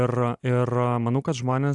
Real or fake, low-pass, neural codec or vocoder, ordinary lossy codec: real; 10.8 kHz; none; AAC, 64 kbps